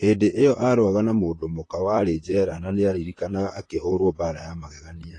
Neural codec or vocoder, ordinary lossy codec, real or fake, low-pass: vocoder, 44.1 kHz, 128 mel bands, Pupu-Vocoder; AAC, 32 kbps; fake; 10.8 kHz